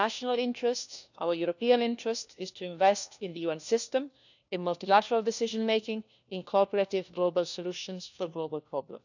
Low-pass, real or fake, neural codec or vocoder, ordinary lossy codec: 7.2 kHz; fake; codec, 16 kHz, 1 kbps, FunCodec, trained on LibriTTS, 50 frames a second; none